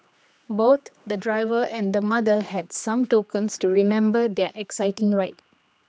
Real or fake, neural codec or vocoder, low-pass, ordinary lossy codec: fake; codec, 16 kHz, 2 kbps, X-Codec, HuBERT features, trained on general audio; none; none